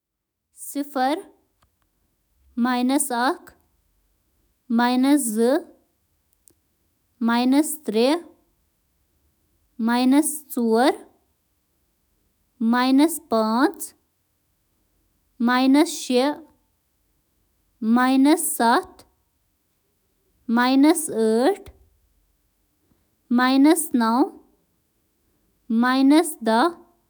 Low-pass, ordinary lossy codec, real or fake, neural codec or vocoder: none; none; fake; autoencoder, 48 kHz, 128 numbers a frame, DAC-VAE, trained on Japanese speech